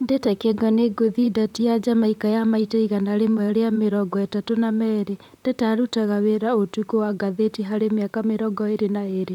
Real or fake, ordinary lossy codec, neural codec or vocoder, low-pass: fake; none; vocoder, 44.1 kHz, 128 mel bands, Pupu-Vocoder; 19.8 kHz